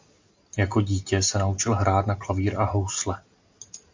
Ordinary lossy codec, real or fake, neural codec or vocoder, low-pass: MP3, 48 kbps; real; none; 7.2 kHz